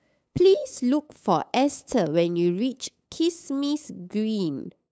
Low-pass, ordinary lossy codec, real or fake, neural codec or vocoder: none; none; fake; codec, 16 kHz, 8 kbps, FunCodec, trained on LibriTTS, 25 frames a second